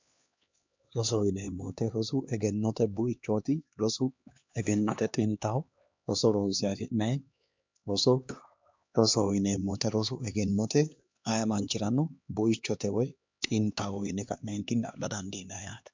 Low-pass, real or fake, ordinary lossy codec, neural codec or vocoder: 7.2 kHz; fake; MP3, 64 kbps; codec, 16 kHz, 2 kbps, X-Codec, HuBERT features, trained on LibriSpeech